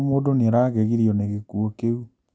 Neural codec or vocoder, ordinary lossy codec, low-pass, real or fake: none; none; none; real